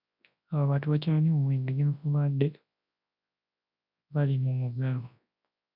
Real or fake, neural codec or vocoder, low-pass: fake; codec, 24 kHz, 0.9 kbps, WavTokenizer, large speech release; 5.4 kHz